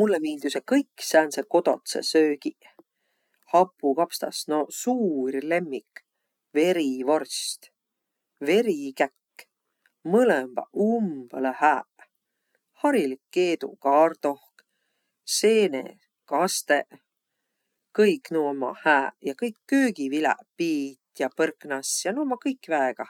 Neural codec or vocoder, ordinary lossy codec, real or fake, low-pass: none; none; real; 19.8 kHz